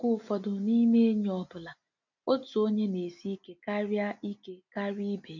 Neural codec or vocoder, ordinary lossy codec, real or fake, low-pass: none; none; real; 7.2 kHz